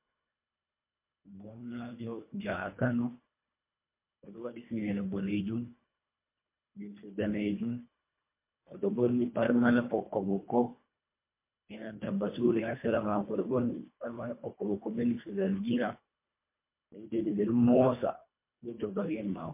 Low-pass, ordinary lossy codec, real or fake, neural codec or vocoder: 3.6 kHz; MP3, 24 kbps; fake; codec, 24 kHz, 1.5 kbps, HILCodec